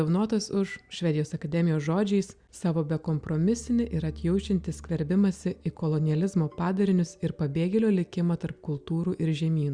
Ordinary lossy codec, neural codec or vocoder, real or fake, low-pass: Opus, 64 kbps; none; real; 9.9 kHz